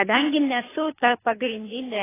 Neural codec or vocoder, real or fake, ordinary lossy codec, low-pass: codec, 24 kHz, 3 kbps, HILCodec; fake; AAC, 16 kbps; 3.6 kHz